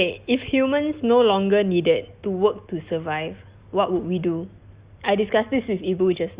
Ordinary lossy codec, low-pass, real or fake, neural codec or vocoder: Opus, 64 kbps; 3.6 kHz; real; none